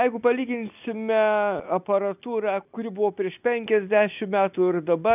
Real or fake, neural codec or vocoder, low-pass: real; none; 3.6 kHz